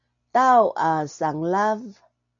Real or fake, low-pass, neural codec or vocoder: real; 7.2 kHz; none